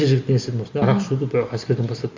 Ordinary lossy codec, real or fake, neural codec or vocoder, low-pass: MP3, 64 kbps; real; none; 7.2 kHz